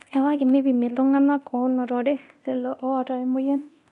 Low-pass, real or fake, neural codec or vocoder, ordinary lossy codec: 10.8 kHz; fake; codec, 24 kHz, 0.9 kbps, DualCodec; none